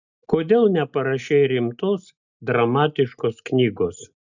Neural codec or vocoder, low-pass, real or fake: none; 7.2 kHz; real